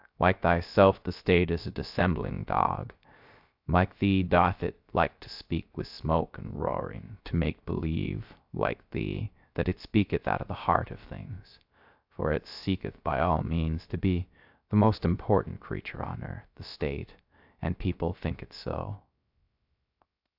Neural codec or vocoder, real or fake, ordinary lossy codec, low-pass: codec, 16 kHz, 0.3 kbps, FocalCodec; fake; AAC, 48 kbps; 5.4 kHz